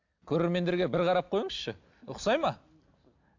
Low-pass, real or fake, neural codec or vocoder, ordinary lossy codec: 7.2 kHz; real; none; AAC, 48 kbps